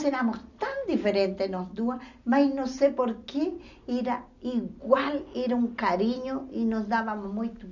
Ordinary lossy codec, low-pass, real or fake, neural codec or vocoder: none; 7.2 kHz; real; none